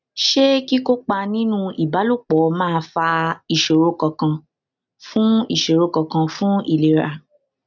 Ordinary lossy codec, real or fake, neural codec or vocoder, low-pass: none; real; none; 7.2 kHz